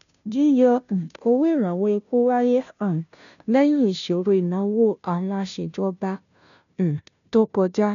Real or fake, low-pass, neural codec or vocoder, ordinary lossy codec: fake; 7.2 kHz; codec, 16 kHz, 0.5 kbps, FunCodec, trained on Chinese and English, 25 frames a second; none